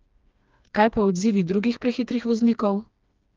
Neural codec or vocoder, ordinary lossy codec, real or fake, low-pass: codec, 16 kHz, 2 kbps, FreqCodec, smaller model; Opus, 32 kbps; fake; 7.2 kHz